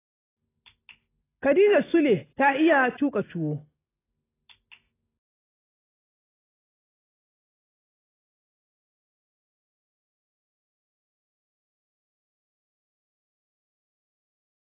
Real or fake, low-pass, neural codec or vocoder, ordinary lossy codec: real; 3.6 kHz; none; AAC, 16 kbps